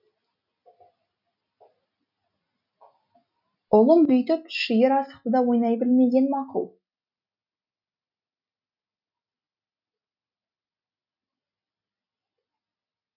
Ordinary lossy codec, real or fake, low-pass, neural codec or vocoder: none; real; 5.4 kHz; none